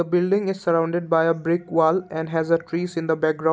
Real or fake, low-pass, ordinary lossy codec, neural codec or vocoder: real; none; none; none